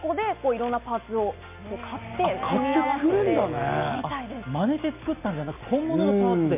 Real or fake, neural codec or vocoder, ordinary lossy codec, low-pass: real; none; MP3, 32 kbps; 3.6 kHz